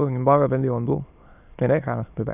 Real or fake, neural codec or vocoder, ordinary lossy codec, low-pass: fake; autoencoder, 22.05 kHz, a latent of 192 numbers a frame, VITS, trained on many speakers; none; 3.6 kHz